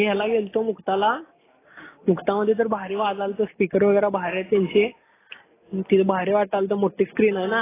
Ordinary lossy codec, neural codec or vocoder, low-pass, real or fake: AAC, 16 kbps; none; 3.6 kHz; real